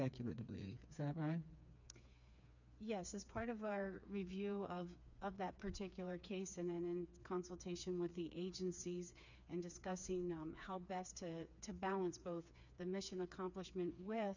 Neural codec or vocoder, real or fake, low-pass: codec, 16 kHz, 4 kbps, FreqCodec, smaller model; fake; 7.2 kHz